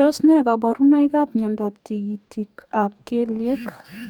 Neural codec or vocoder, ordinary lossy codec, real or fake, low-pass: codec, 44.1 kHz, 2.6 kbps, DAC; none; fake; 19.8 kHz